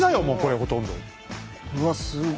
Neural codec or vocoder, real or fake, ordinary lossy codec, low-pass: none; real; none; none